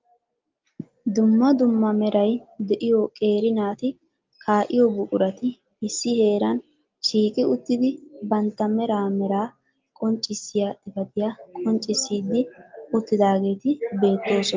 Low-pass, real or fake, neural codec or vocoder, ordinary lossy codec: 7.2 kHz; real; none; Opus, 32 kbps